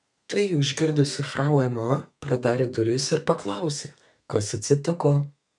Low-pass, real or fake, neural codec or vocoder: 10.8 kHz; fake; codec, 32 kHz, 1.9 kbps, SNAC